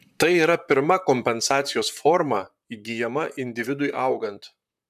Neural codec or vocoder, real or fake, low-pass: vocoder, 44.1 kHz, 128 mel bands, Pupu-Vocoder; fake; 14.4 kHz